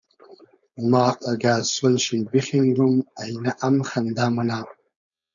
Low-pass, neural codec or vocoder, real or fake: 7.2 kHz; codec, 16 kHz, 4.8 kbps, FACodec; fake